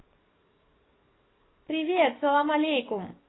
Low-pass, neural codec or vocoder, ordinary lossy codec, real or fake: 7.2 kHz; codec, 44.1 kHz, 7.8 kbps, DAC; AAC, 16 kbps; fake